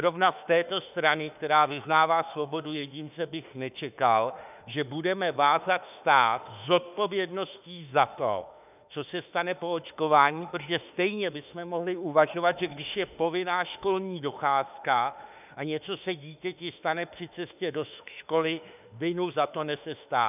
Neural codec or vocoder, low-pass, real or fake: autoencoder, 48 kHz, 32 numbers a frame, DAC-VAE, trained on Japanese speech; 3.6 kHz; fake